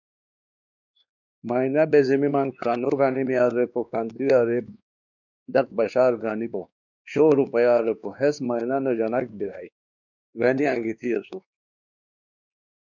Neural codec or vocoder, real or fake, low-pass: codec, 16 kHz, 2 kbps, X-Codec, WavLM features, trained on Multilingual LibriSpeech; fake; 7.2 kHz